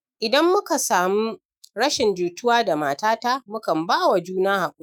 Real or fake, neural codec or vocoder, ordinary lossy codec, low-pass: fake; autoencoder, 48 kHz, 128 numbers a frame, DAC-VAE, trained on Japanese speech; none; none